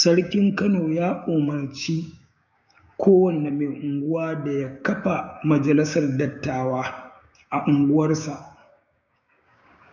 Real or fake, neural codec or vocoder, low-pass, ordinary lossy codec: fake; codec, 16 kHz, 6 kbps, DAC; 7.2 kHz; none